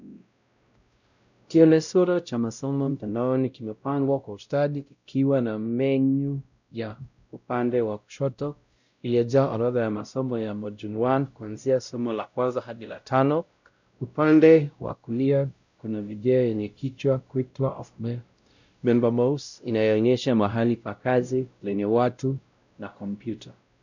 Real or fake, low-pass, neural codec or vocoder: fake; 7.2 kHz; codec, 16 kHz, 0.5 kbps, X-Codec, WavLM features, trained on Multilingual LibriSpeech